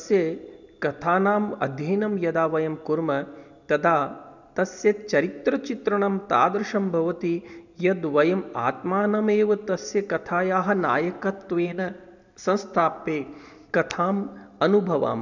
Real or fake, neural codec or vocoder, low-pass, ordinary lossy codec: real; none; 7.2 kHz; none